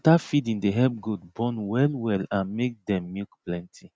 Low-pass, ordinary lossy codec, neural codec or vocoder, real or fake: none; none; none; real